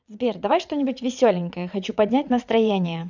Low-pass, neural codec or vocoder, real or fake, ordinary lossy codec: 7.2 kHz; vocoder, 22.05 kHz, 80 mel bands, WaveNeXt; fake; none